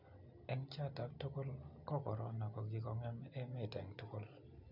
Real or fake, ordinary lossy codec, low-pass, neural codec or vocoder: real; none; 5.4 kHz; none